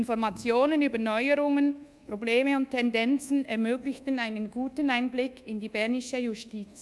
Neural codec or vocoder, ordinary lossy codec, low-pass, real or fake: codec, 24 kHz, 1.2 kbps, DualCodec; none; none; fake